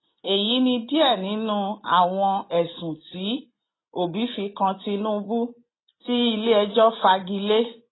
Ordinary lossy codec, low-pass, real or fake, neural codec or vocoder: AAC, 16 kbps; 7.2 kHz; real; none